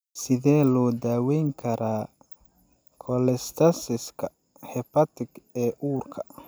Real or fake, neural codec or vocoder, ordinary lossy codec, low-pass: real; none; none; none